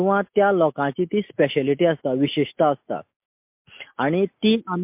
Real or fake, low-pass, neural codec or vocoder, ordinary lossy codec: real; 3.6 kHz; none; MP3, 32 kbps